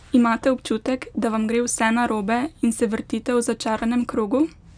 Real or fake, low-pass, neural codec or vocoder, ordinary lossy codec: real; 9.9 kHz; none; none